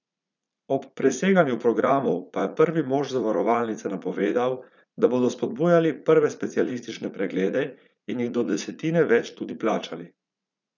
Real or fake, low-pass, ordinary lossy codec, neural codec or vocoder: fake; 7.2 kHz; none; vocoder, 44.1 kHz, 80 mel bands, Vocos